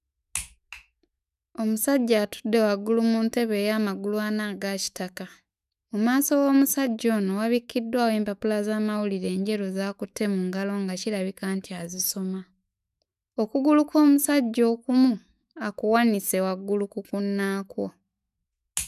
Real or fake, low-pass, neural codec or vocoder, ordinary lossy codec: fake; 14.4 kHz; autoencoder, 48 kHz, 128 numbers a frame, DAC-VAE, trained on Japanese speech; none